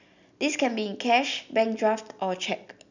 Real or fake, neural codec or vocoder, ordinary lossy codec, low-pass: real; none; none; 7.2 kHz